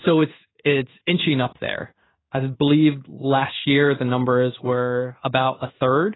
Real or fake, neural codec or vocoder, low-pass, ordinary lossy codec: fake; codec, 16 kHz in and 24 kHz out, 1 kbps, XY-Tokenizer; 7.2 kHz; AAC, 16 kbps